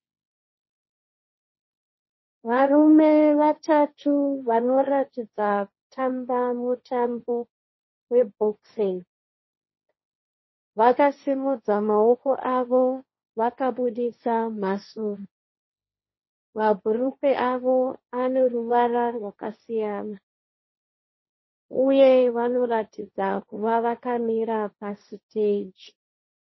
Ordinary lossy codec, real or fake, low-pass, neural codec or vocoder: MP3, 24 kbps; fake; 7.2 kHz; codec, 16 kHz, 1.1 kbps, Voila-Tokenizer